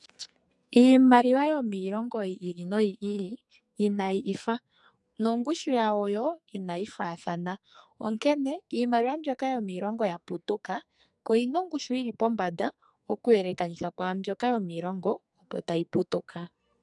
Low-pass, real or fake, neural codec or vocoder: 10.8 kHz; fake; codec, 44.1 kHz, 2.6 kbps, SNAC